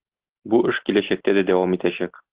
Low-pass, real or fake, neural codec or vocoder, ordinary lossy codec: 3.6 kHz; real; none; Opus, 16 kbps